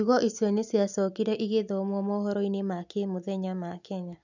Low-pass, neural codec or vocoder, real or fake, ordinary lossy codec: 7.2 kHz; none; real; none